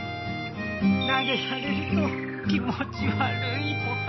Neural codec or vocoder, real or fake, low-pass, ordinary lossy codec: none; real; 7.2 kHz; MP3, 24 kbps